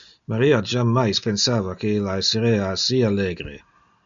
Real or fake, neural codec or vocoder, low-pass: real; none; 7.2 kHz